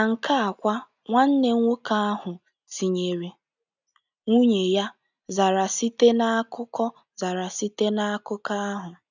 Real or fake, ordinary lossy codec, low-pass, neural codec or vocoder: real; none; 7.2 kHz; none